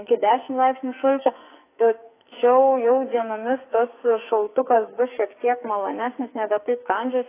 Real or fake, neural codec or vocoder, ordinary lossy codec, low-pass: fake; codec, 44.1 kHz, 2.6 kbps, SNAC; AAC, 24 kbps; 3.6 kHz